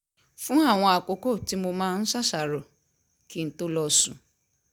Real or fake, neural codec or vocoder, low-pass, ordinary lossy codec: real; none; none; none